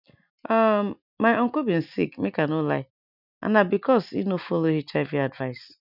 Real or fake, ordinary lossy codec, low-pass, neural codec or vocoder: real; none; 5.4 kHz; none